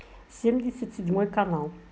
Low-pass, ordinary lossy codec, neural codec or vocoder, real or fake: none; none; none; real